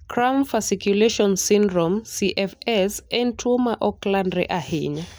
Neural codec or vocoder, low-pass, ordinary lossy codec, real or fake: none; none; none; real